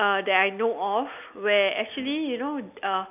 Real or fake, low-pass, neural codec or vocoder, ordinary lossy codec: real; 3.6 kHz; none; none